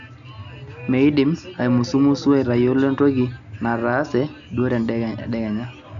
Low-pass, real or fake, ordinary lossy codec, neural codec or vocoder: 7.2 kHz; real; none; none